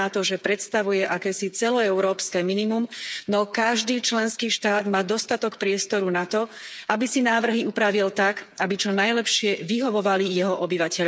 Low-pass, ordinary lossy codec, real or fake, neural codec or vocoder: none; none; fake; codec, 16 kHz, 8 kbps, FreqCodec, smaller model